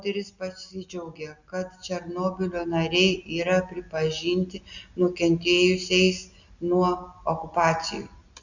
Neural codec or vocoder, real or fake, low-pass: none; real; 7.2 kHz